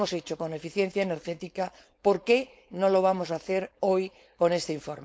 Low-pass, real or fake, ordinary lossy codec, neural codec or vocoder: none; fake; none; codec, 16 kHz, 4.8 kbps, FACodec